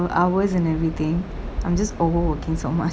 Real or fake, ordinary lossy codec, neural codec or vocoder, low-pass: real; none; none; none